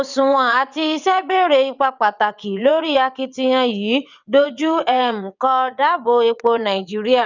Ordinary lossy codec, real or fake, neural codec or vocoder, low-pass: none; fake; vocoder, 22.05 kHz, 80 mel bands, WaveNeXt; 7.2 kHz